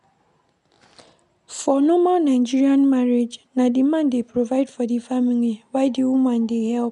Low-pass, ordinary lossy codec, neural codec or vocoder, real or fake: 10.8 kHz; none; none; real